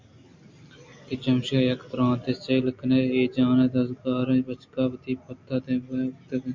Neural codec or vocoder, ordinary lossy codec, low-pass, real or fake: none; MP3, 64 kbps; 7.2 kHz; real